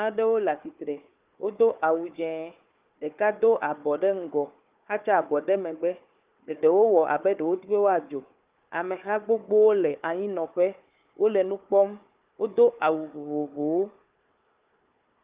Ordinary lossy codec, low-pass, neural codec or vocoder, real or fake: Opus, 32 kbps; 3.6 kHz; codec, 16 kHz, 4 kbps, FunCodec, trained on Chinese and English, 50 frames a second; fake